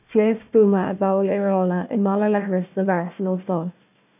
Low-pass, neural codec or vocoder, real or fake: 3.6 kHz; codec, 16 kHz, 1 kbps, FunCodec, trained on Chinese and English, 50 frames a second; fake